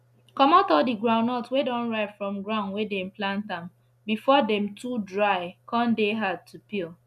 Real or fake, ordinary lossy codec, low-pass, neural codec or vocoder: real; none; 14.4 kHz; none